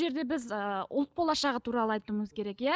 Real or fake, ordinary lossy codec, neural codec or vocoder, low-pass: real; none; none; none